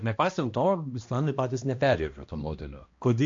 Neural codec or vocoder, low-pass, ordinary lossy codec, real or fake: codec, 16 kHz, 1 kbps, X-Codec, HuBERT features, trained on balanced general audio; 7.2 kHz; MP3, 48 kbps; fake